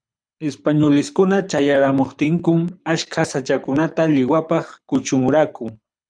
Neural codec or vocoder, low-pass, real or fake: codec, 24 kHz, 6 kbps, HILCodec; 9.9 kHz; fake